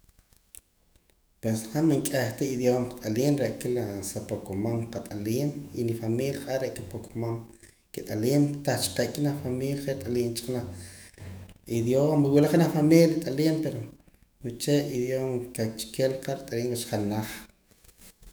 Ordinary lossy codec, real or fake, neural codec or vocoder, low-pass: none; fake; autoencoder, 48 kHz, 128 numbers a frame, DAC-VAE, trained on Japanese speech; none